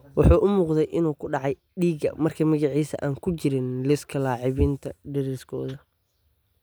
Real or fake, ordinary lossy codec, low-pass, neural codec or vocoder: real; none; none; none